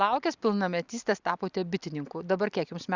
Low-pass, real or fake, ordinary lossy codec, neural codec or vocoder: 7.2 kHz; real; Opus, 64 kbps; none